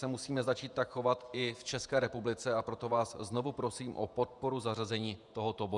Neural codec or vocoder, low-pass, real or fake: vocoder, 48 kHz, 128 mel bands, Vocos; 10.8 kHz; fake